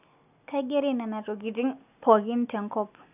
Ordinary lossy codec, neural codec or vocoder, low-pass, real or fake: none; none; 3.6 kHz; real